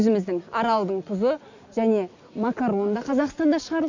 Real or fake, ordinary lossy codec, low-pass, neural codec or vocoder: real; none; 7.2 kHz; none